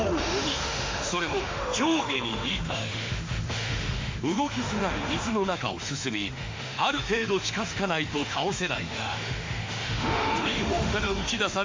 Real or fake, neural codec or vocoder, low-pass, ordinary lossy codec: fake; autoencoder, 48 kHz, 32 numbers a frame, DAC-VAE, trained on Japanese speech; 7.2 kHz; MP3, 48 kbps